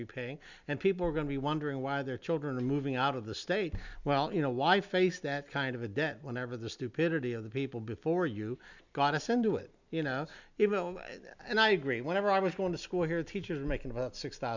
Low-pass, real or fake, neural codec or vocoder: 7.2 kHz; real; none